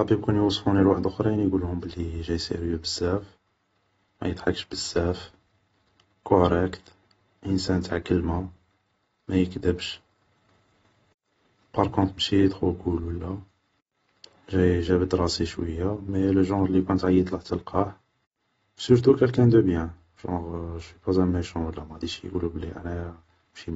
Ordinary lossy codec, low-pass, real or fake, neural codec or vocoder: AAC, 24 kbps; 14.4 kHz; real; none